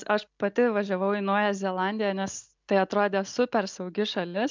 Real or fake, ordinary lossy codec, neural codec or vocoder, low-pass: real; MP3, 64 kbps; none; 7.2 kHz